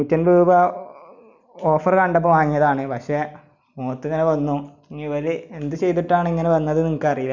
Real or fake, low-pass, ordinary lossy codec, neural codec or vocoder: real; 7.2 kHz; none; none